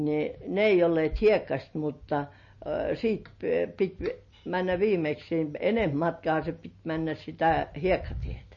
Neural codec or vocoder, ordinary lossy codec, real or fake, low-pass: none; MP3, 32 kbps; real; 7.2 kHz